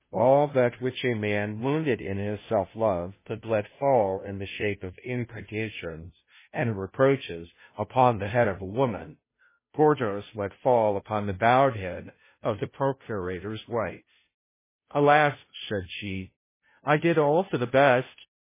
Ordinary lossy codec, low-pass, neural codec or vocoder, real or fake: MP3, 16 kbps; 3.6 kHz; codec, 16 kHz, 0.5 kbps, FunCodec, trained on Chinese and English, 25 frames a second; fake